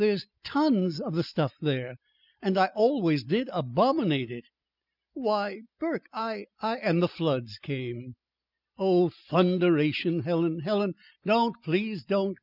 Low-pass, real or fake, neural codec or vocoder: 5.4 kHz; real; none